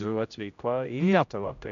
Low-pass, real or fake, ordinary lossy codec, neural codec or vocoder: 7.2 kHz; fake; MP3, 96 kbps; codec, 16 kHz, 0.5 kbps, X-Codec, HuBERT features, trained on general audio